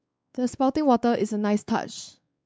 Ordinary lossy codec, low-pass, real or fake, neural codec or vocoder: none; none; fake; codec, 16 kHz, 4 kbps, X-Codec, WavLM features, trained on Multilingual LibriSpeech